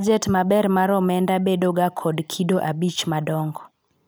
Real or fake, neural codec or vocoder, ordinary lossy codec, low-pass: real; none; none; none